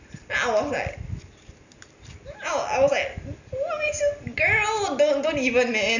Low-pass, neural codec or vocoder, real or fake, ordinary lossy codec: 7.2 kHz; none; real; none